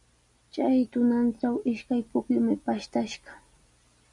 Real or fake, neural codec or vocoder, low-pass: real; none; 10.8 kHz